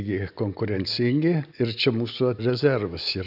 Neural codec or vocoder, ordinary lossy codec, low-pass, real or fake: none; AAC, 48 kbps; 5.4 kHz; real